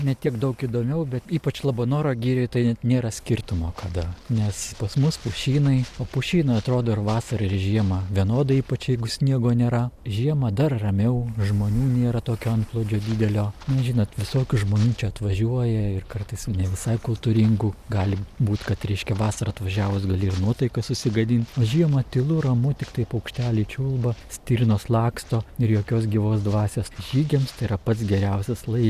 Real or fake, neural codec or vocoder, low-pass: real; none; 14.4 kHz